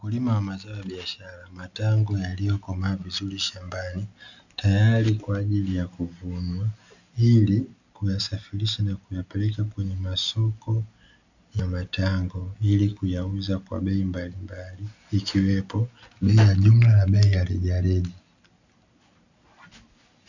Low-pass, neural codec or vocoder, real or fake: 7.2 kHz; none; real